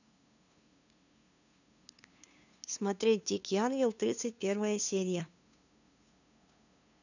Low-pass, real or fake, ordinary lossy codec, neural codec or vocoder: 7.2 kHz; fake; none; codec, 16 kHz, 2 kbps, FunCodec, trained on LibriTTS, 25 frames a second